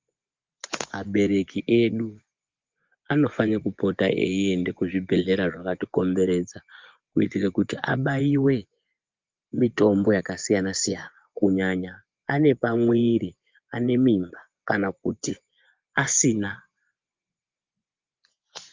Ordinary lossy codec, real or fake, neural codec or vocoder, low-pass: Opus, 32 kbps; fake; vocoder, 24 kHz, 100 mel bands, Vocos; 7.2 kHz